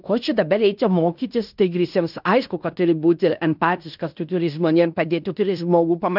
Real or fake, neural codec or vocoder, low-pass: fake; codec, 16 kHz in and 24 kHz out, 0.9 kbps, LongCat-Audio-Codec, fine tuned four codebook decoder; 5.4 kHz